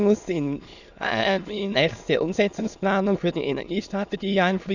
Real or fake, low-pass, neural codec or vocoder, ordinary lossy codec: fake; 7.2 kHz; autoencoder, 22.05 kHz, a latent of 192 numbers a frame, VITS, trained on many speakers; none